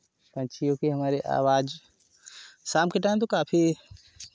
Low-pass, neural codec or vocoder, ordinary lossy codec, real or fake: none; none; none; real